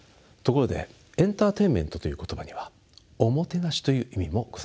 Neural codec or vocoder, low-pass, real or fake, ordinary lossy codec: none; none; real; none